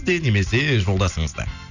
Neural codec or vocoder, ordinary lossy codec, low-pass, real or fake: none; none; 7.2 kHz; real